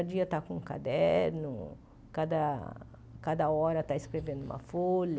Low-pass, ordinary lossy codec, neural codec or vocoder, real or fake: none; none; none; real